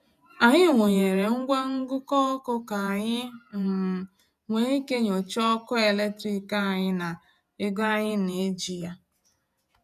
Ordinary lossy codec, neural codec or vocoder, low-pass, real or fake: none; vocoder, 48 kHz, 128 mel bands, Vocos; 14.4 kHz; fake